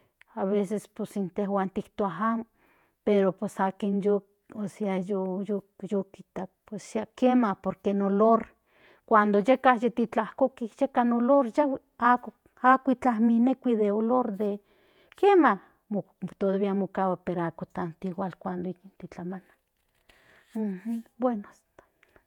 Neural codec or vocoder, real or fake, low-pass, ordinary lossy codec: vocoder, 48 kHz, 128 mel bands, Vocos; fake; 19.8 kHz; none